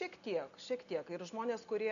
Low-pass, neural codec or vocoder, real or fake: 7.2 kHz; none; real